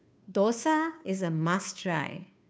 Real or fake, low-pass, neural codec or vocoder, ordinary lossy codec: fake; none; codec, 16 kHz, 2 kbps, FunCodec, trained on Chinese and English, 25 frames a second; none